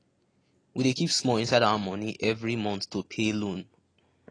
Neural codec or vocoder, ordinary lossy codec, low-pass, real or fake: none; AAC, 32 kbps; 9.9 kHz; real